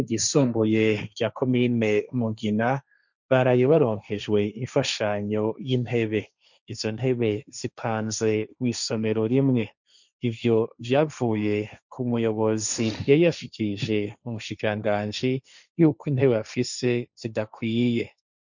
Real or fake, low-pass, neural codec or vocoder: fake; 7.2 kHz; codec, 16 kHz, 1.1 kbps, Voila-Tokenizer